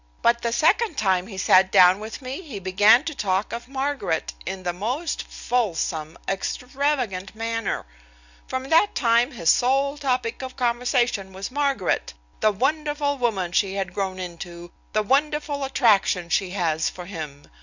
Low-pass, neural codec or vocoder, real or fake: 7.2 kHz; none; real